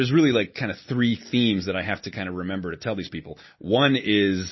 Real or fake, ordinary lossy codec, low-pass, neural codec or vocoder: real; MP3, 24 kbps; 7.2 kHz; none